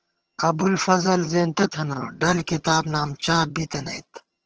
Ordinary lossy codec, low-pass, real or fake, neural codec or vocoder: Opus, 16 kbps; 7.2 kHz; fake; vocoder, 22.05 kHz, 80 mel bands, HiFi-GAN